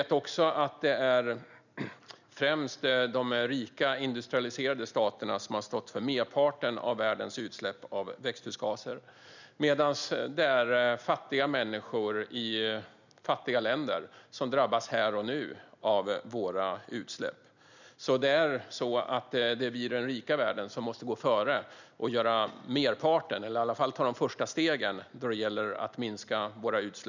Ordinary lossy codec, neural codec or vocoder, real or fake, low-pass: none; none; real; 7.2 kHz